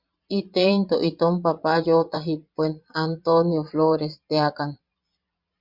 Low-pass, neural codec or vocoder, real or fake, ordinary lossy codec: 5.4 kHz; vocoder, 22.05 kHz, 80 mel bands, Vocos; fake; Opus, 64 kbps